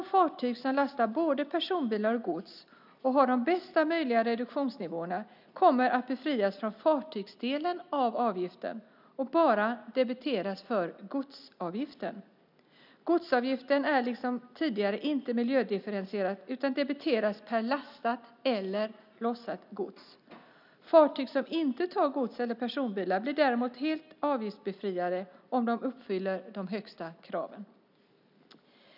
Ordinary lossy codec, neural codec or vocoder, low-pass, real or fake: none; none; 5.4 kHz; real